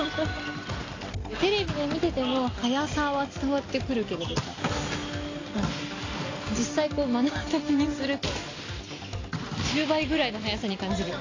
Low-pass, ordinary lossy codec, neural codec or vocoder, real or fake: 7.2 kHz; AAC, 32 kbps; none; real